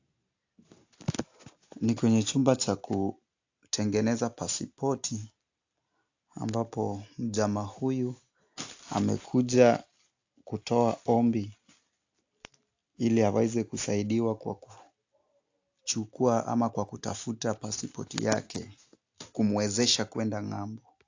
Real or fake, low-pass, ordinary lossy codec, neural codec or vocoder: real; 7.2 kHz; AAC, 48 kbps; none